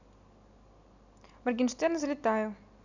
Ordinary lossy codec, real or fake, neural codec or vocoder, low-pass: none; real; none; 7.2 kHz